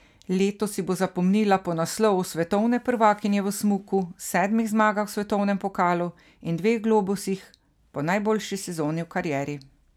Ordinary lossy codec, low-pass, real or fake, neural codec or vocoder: none; 19.8 kHz; real; none